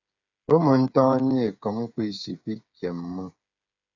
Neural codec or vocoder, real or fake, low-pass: codec, 16 kHz, 8 kbps, FreqCodec, smaller model; fake; 7.2 kHz